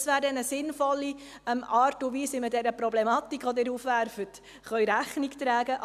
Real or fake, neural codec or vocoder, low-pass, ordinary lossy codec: real; none; 14.4 kHz; none